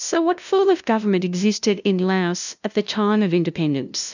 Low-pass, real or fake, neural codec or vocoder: 7.2 kHz; fake; codec, 16 kHz, 0.5 kbps, FunCodec, trained on LibriTTS, 25 frames a second